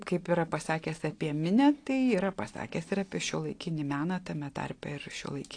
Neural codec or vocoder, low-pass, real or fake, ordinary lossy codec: none; 9.9 kHz; real; AAC, 48 kbps